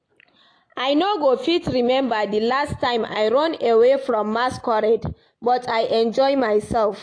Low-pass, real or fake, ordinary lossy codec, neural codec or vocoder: 9.9 kHz; real; AAC, 48 kbps; none